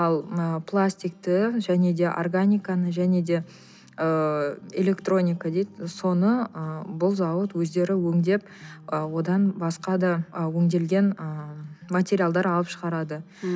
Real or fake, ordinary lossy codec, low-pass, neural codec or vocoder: real; none; none; none